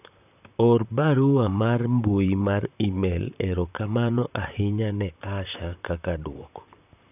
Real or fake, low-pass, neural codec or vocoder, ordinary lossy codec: fake; 3.6 kHz; vocoder, 44.1 kHz, 128 mel bands, Pupu-Vocoder; none